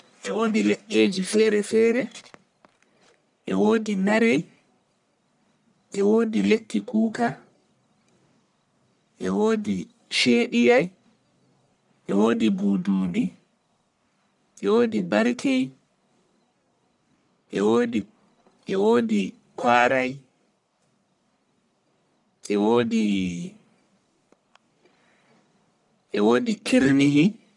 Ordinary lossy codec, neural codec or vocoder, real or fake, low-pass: none; codec, 44.1 kHz, 1.7 kbps, Pupu-Codec; fake; 10.8 kHz